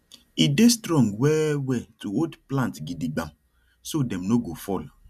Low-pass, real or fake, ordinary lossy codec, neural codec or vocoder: 14.4 kHz; real; none; none